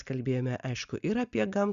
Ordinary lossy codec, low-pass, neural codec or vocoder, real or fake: Opus, 64 kbps; 7.2 kHz; none; real